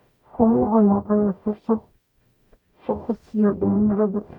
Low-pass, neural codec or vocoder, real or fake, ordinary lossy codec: 19.8 kHz; codec, 44.1 kHz, 0.9 kbps, DAC; fake; none